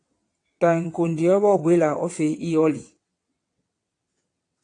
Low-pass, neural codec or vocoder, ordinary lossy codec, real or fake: 9.9 kHz; vocoder, 22.05 kHz, 80 mel bands, WaveNeXt; AAC, 48 kbps; fake